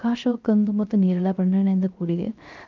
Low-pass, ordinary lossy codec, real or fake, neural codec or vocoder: 7.2 kHz; Opus, 32 kbps; fake; codec, 16 kHz, 0.3 kbps, FocalCodec